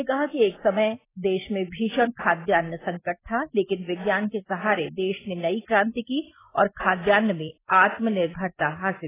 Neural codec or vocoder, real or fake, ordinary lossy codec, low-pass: none; real; AAC, 16 kbps; 3.6 kHz